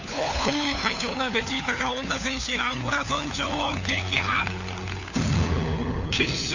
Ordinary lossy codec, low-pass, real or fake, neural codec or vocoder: none; 7.2 kHz; fake; codec, 16 kHz, 4 kbps, FunCodec, trained on LibriTTS, 50 frames a second